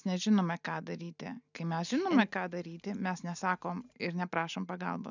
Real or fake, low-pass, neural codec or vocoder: real; 7.2 kHz; none